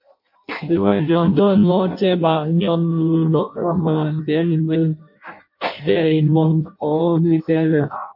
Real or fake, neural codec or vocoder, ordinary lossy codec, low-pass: fake; codec, 16 kHz in and 24 kHz out, 0.6 kbps, FireRedTTS-2 codec; MP3, 32 kbps; 5.4 kHz